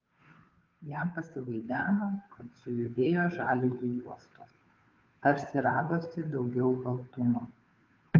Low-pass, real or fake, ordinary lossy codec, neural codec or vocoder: 7.2 kHz; fake; Opus, 24 kbps; codec, 16 kHz, 2 kbps, FunCodec, trained on Chinese and English, 25 frames a second